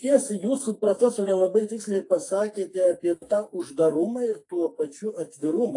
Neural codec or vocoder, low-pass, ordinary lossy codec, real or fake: codec, 44.1 kHz, 2.6 kbps, SNAC; 10.8 kHz; AAC, 48 kbps; fake